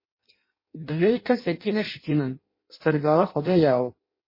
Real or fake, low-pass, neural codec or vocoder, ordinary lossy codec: fake; 5.4 kHz; codec, 16 kHz in and 24 kHz out, 0.6 kbps, FireRedTTS-2 codec; MP3, 24 kbps